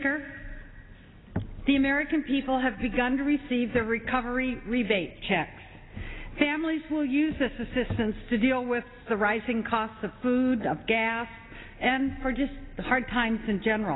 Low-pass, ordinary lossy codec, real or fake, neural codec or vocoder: 7.2 kHz; AAC, 16 kbps; real; none